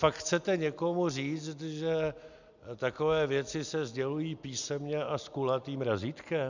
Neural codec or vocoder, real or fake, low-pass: none; real; 7.2 kHz